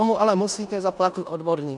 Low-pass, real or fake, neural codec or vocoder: 10.8 kHz; fake; codec, 16 kHz in and 24 kHz out, 0.9 kbps, LongCat-Audio-Codec, four codebook decoder